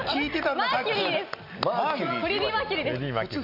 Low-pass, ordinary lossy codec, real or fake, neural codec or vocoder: 5.4 kHz; none; real; none